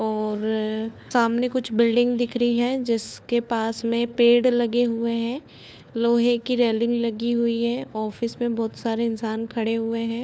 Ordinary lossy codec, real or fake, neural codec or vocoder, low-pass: none; fake; codec, 16 kHz, 4 kbps, FunCodec, trained on LibriTTS, 50 frames a second; none